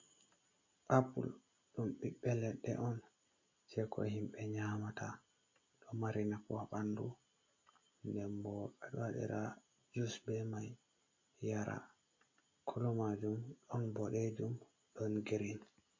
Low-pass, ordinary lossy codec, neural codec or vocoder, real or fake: 7.2 kHz; MP3, 32 kbps; none; real